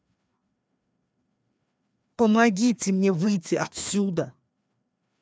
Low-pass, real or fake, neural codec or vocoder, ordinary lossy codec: none; fake; codec, 16 kHz, 2 kbps, FreqCodec, larger model; none